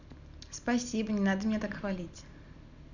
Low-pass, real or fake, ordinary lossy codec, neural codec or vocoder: 7.2 kHz; real; none; none